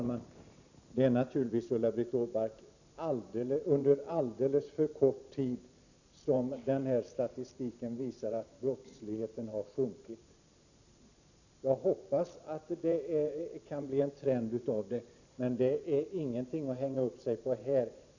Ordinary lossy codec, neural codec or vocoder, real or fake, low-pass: none; vocoder, 44.1 kHz, 128 mel bands every 512 samples, BigVGAN v2; fake; 7.2 kHz